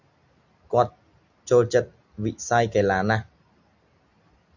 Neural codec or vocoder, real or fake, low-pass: none; real; 7.2 kHz